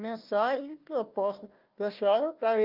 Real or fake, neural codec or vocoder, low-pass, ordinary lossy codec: fake; codec, 16 kHz, 1 kbps, FunCodec, trained on Chinese and English, 50 frames a second; 5.4 kHz; Opus, 24 kbps